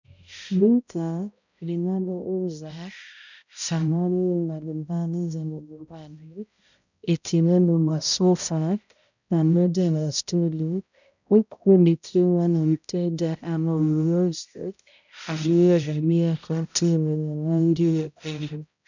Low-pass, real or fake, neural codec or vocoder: 7.2 kHz; fake; codec, 16 kHz, 0.5 kbps, X-Codec, HuBERT features, trained on balanced general audio